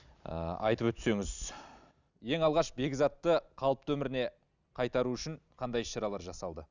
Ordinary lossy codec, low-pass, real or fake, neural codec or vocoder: none; 7.2 kHz; real; none